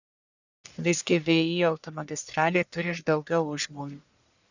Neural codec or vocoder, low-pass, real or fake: codec, 44.1 kHz, 1.7 kbps, Pupu-Codec; 7.2 kHz; fake